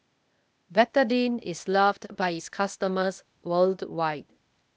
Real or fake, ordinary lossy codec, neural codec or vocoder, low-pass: fake; none; codec, 16 kHz, 0.8 kbps, ZipCodec; none